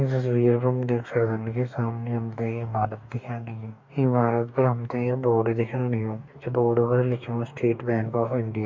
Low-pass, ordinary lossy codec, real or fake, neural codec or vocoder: 7.2 kHz; MP3, 48 kbps; fake; codec, 44.1 kHz, 2.6 kbps, DAC